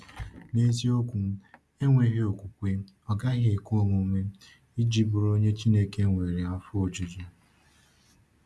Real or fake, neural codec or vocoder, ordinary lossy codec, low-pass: real; none; none; none